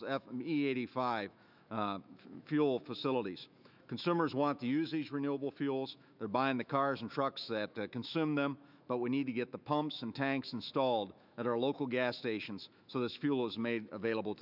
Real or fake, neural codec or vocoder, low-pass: fake; autoencoder, 48 kHz, 128 numbers a frame, DAC-VAE, trained on Japanese speech; 5.4 kHz